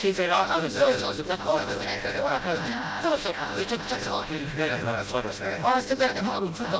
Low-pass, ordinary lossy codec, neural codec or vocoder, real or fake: none; none; codec, 16 kHz, 0.5 kbps, FreqCodec, smaller model; fake